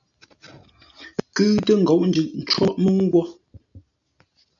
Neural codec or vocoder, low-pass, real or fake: none; 7.2 kHz; real